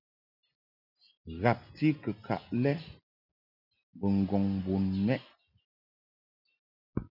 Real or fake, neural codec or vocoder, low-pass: real; none; 5.4 kHz